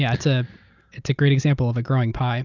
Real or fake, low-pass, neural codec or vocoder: real; 7.2 kHz; none